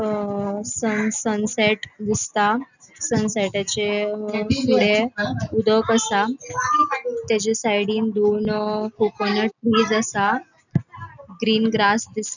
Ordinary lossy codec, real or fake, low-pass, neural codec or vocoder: none; real; 7.2 kHz; none